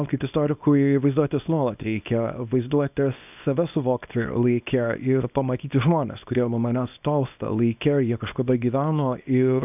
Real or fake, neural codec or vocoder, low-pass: fake; codec, 24 kHz, 0.9 kbps, WavTokenizer, small release; 3.6 kHz